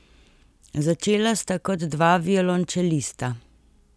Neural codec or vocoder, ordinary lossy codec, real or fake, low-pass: none; none; real; none